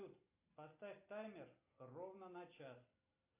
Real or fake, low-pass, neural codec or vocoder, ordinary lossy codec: real; 3.6 kHz; none; AAC, 24 kbps